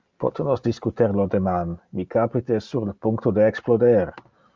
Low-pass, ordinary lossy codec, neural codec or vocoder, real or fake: 7.2 kHz; Opus, 32 kbps; none; real